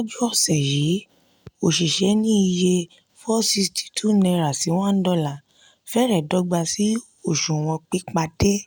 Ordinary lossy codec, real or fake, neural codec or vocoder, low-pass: none; real; none; none